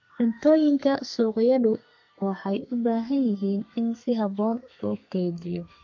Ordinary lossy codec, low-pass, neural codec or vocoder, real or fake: MP3, 48 kbps; 7.2 kHz; codec, 32 kHz, 1.9 kbps, SNAC; fake